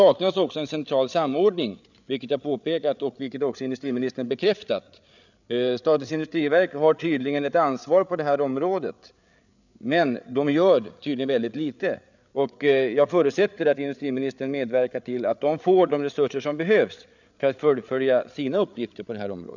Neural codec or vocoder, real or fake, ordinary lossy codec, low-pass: codec, 16 kHz, 8 kbps, FreqCodec, larger model; fake; none; 7.2 kHz